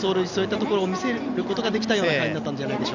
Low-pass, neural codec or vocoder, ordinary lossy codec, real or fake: 7.2 kHz; none; none; real